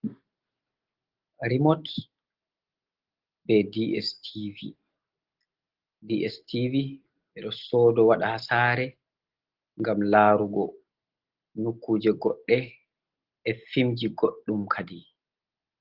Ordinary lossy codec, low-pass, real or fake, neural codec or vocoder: Opus, 16 kbps; 5.4 kHz; real; none